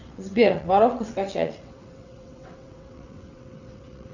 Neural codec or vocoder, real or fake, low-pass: vocoder, 22.05 kHz, 80 mel bands, WaveNeXt; fake; 7.2 kHz